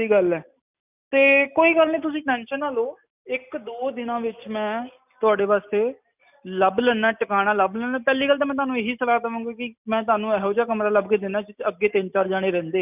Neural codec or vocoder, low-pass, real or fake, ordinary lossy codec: none; 3.6 kHz; real; none